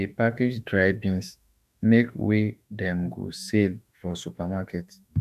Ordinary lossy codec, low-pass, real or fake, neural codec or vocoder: none; 14.4 kHz; fake; autoencoder, 48 kHz, 32 numbers a frame, DAC-VAE, trained on Japanese speech